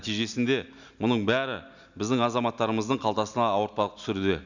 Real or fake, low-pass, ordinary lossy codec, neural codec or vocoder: real; 7.2 kHz; none; none